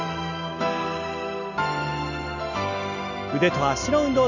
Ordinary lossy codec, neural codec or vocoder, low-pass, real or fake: none; none; 7.2 kHz; real